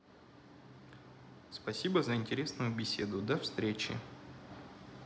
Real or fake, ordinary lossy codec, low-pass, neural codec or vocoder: real; none; none; none